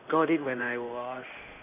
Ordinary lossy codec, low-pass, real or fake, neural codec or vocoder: none; 3.6 kHz; fake; codec, 16 kHz in and 24 kHz out, 1 kbps, XY-Tokenizer